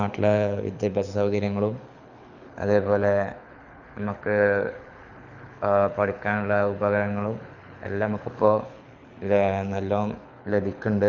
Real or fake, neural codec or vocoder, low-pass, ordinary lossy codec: fake; codec, 24 kHz, 6 kbps, HILCodec; 7.2 kHz; none